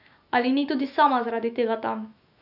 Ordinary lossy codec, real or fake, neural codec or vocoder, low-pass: none; fake; autoencoder, 48 kHz, 128 numbers a frame, DAC-VAE, trained on Japanese speech; 5.4 kHz